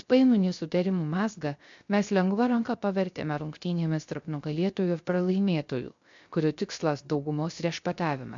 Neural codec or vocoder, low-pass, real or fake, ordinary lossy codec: codec, 16 kHz, 0.3 kbps, FocalCodec; 7.2 kHz; fake; AAC, 64 kbps